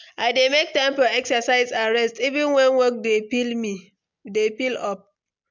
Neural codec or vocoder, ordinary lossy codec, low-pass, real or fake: none; MP3, 64 kbps; 7.2 kHz; real